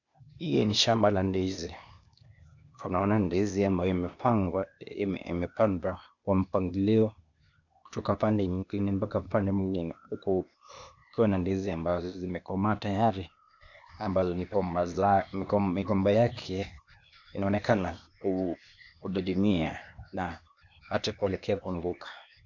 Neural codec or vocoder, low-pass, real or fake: codec, 16 kHz, 0.8 kbps, ZipCodec; 7.2 kHz; fake